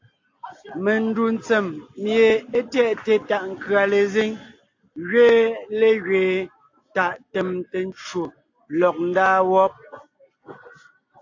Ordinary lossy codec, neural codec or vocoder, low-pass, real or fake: AAC, 48 kbps; none; 7.2 kHz; real